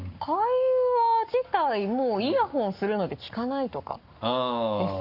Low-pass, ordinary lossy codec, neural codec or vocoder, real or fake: 5.4 kHz; Opus, 64 kbps; codec, 44.1 kHz, 7.8 kbps, Pupu-Codec; fake